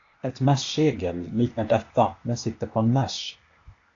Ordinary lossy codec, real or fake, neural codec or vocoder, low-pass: AAC, 48 kbps; fake; codec, 16 kHz, 0.8 kbps, ZipCodec; 7.2 kHz